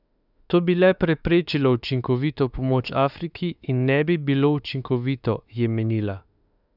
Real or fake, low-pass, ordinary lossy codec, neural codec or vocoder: fake; 5.4 kHz; none; autoencoder, 48 kHz, 32 numbers a frame, DAC-VAE, trained on Japanese speech